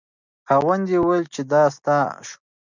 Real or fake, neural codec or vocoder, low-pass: real; none; 7.2 kHz